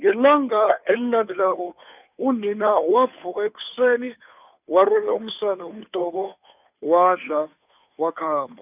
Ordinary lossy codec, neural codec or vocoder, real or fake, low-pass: none; codec, 16 kHz, 2 kbps, FunCodec, trained on Chinese and English, 25 frames a second; fake; 3.6 kHz